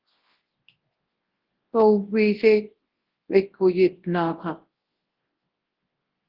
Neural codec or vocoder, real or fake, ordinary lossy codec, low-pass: codec, 24 kHz, 0.9 kbps, WavTokenizer, large speech release; fake; Opus, 16 kbps; 5.4 kHz